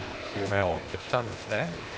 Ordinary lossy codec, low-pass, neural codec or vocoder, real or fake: none; none; codec, 16 kHz, 0.8 kbps, ZipCodec; fake